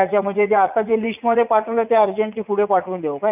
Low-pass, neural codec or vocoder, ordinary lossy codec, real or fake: 3.6 kHz; vocoder, 44.1 kHz, 80 mel bands, Vocos; none; fake